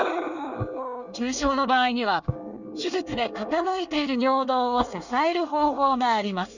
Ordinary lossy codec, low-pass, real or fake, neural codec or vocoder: none; 7.2 kHz; fake; codec, 24 kHz, 1 kbps, SNAC